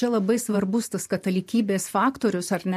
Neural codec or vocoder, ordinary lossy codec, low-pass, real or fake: vocoder, 44.1 kHz, 128 mel bands every 512 samples, BigVGAN v2; MP3, 64 kbps; 14.4 kHz; fake